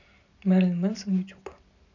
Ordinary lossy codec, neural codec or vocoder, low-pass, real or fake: none; none; 7.2 kHz; real